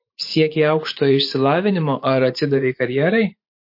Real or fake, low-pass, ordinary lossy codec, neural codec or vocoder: fake; 5.4 kHz; MP3, 32 kbps; vocoder, 44.1 kHz, 128 mel bands every 512 samples, BigVGAN v2